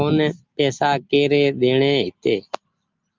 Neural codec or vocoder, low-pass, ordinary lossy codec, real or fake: none; 7.2 kHz; Opus, 32 kbps; real